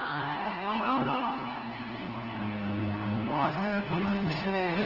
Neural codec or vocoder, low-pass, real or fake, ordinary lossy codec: codec, 16 kHz, 1 kbps, FunCodec, trained on LibriTTS, 50 frames a second; 5.4 kHz; fake; Opus, 16 kbps